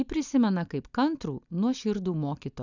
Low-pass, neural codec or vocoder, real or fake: 7.2 kHz; none; real